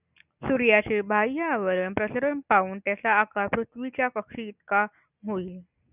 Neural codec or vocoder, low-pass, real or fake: none; 3.6 kHz; real